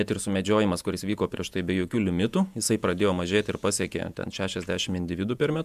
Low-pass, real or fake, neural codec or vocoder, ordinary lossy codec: 14.4 kHz; fake; vocoder, 48 kHz, 128 mel bands, Vocos; MP3, 96 kbps